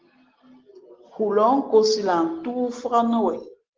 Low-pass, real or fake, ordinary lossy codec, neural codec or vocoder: 7.2 kHz; real; Opus, 16 kbps; none